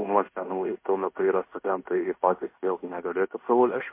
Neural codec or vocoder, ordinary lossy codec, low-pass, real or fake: codec, 16 kHz in and 24 kHz out, 0.9 kbps, LongCat-Audio-Codec, fine tuned four codebook decoder; MP3, 24 kbps; 3.6 kHz; fake